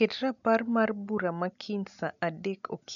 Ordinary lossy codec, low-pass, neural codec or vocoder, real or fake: none; 7.2 kHz; none; real